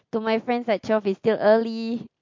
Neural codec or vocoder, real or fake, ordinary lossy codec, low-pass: none; real; MP3, 48 kbps; 7.2 kHz